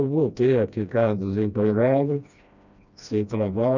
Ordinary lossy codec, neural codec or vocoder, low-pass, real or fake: none; codec, 16 kHz, 1 kbps, FreqCodec, smaller model; 7.2 kHz; fake